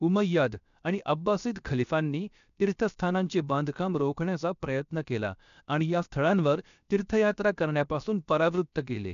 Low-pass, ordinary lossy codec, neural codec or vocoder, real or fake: 7.2 kHz; none; codec, 16 kHz, 0.7 kbps, FocalCodec; fake